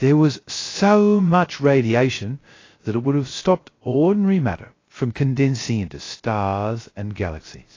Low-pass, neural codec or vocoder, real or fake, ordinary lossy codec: 7.2 kHz; codec, 16 kHz, 0.3 kbps, FocalCodec; fake; AAC, 32 kbps